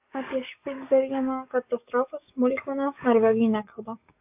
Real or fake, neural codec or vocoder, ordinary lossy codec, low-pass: fake; codec, 44.1 kHz, 7.8 kbps, DAC; AAC, 32 kbps; 3.6 kHz